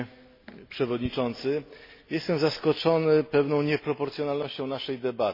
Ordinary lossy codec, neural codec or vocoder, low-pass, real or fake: MP3, 24 kbps; none; 5.4 kHz; real